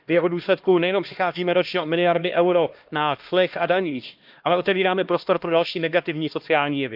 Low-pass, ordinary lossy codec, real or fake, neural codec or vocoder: 5.4 kHz; Opus, 24 kbps; fake; codec, 16 kHz, 1 kbps, X-Codec, HuBERT features, trained on LibriSpeech